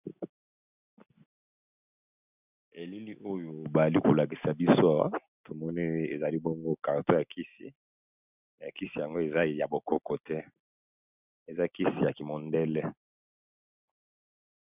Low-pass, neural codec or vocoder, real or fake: 3.6 kHz; none; real